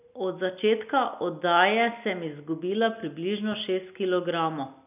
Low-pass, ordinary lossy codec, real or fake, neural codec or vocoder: 3.6 kHz; none; real; none